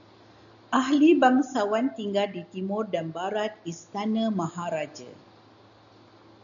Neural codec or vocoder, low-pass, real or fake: none; 7.2 kHz; real